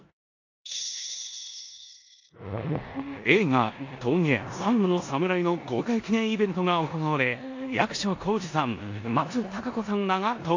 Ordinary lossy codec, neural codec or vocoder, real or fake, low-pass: AAC, 48 kbps; codec, 16 kHz in and 24 kHz out, 0.9 kbps, LongCat-Audio-Codec, four codebook decoder; fake; 7.2 kHz